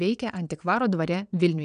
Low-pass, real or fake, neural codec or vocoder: 9.9 kHz; fake; vocoder, 22.05 kHz, 80 mel bands, WaveNeXt